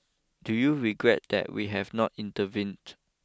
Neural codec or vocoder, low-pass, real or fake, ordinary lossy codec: none; none; real; none